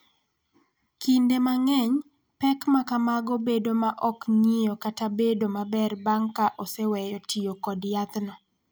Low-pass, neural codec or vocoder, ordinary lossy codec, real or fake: none; none; none; real